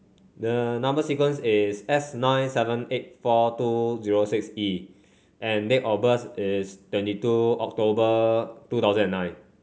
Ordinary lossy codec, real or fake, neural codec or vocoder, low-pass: none; real; none; none